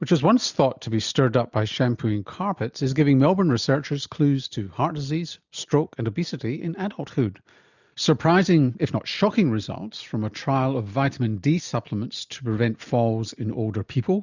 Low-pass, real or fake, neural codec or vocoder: 7.2 kHz; real; none